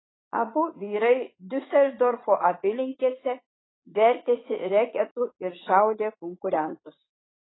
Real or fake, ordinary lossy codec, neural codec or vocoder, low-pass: fake; AAC, 16 kbps; vocoder, 44.1 kHz, 80 mel bands, Vocos; 7.2 kHz